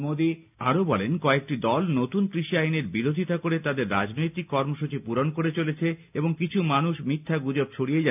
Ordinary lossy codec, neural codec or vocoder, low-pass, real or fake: none; none; 3.6 kHz; real